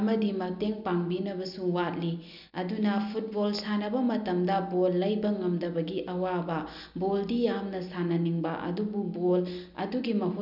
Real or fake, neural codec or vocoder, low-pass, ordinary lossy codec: real; none; 5.4 kHz; none